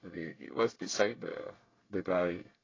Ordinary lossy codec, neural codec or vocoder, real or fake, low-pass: AAC, 32 kbps; codec, 24 kHz, 1 kbps, SNAC; fake; 7.2 kHz